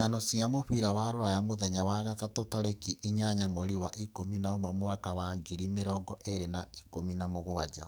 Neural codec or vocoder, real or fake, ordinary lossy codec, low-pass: codec, 44.1 kHz, 2.6 kbps, SNAC; fake; none; none